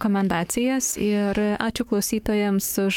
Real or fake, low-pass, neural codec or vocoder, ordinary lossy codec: fake; 19.8 kHz; codec, 44.1 kHz, 7.8 kbps, DAC; MP3, 96 kbps